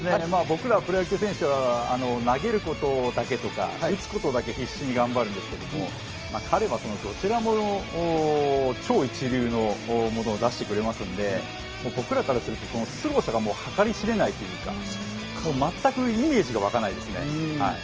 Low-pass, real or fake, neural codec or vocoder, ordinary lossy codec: 7.2 kHz; real; none; Opus, 24 kbps